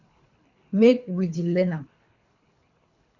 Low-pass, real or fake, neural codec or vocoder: 7.2 kHz; fake; codec, 24 kHz, 3 kbps, HILCodec